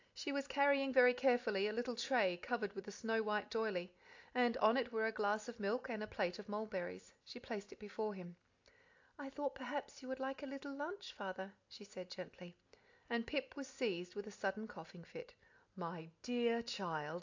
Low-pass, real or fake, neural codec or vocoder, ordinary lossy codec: 7.2 kHz; real; none; AAC, 48 kbps